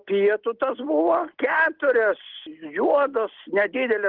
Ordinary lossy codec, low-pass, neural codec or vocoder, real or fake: Opus, 16 kbps; 5.4 kHz; none; real